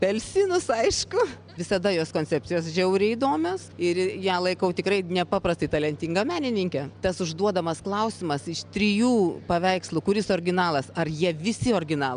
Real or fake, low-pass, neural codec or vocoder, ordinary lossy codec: real; 9.9 kHz; none; MP3, 96 kbps